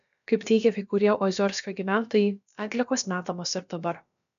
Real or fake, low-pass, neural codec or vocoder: fake; 7.2 kHz; codec, 16 kHz, about 1 kbps, DyCAST, with the encoder's durations